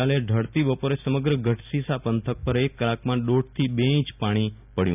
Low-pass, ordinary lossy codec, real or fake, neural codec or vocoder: 3.6 kHz; none; real; none